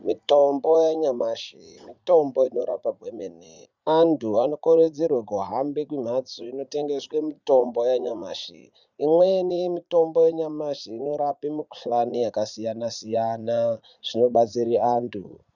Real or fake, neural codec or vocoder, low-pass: fake; vocoder, 44.1 kHz, 80 mel bands, Vocos; 7.2 kHz